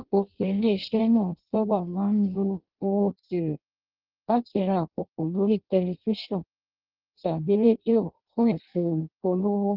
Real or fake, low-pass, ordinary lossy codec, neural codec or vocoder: fake; 5.4 kHz; Opus, 16 kbps; codec, 16 kHz in and 24 kHz out, 0.6 kbps, FireRedTTS-2 codec